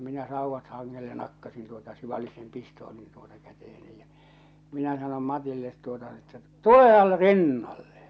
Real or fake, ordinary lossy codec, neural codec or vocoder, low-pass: real; none; none; none